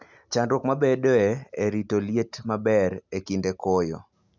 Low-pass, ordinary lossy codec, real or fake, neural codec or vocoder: 7.2 kHz; none; real; none